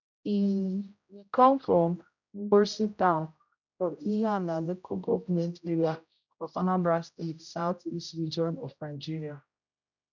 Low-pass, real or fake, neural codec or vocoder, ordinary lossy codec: 7.2 kHz; fake; codec, 16 kHz, 0.5 kbps, X-Codec, HuBERT features, trained on general audio; none